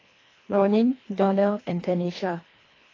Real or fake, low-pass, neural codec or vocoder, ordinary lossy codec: fake; 7.2 kHz; codec, 24 kHz, 1.5 kbps, HILCodec; AAC, 32 kbps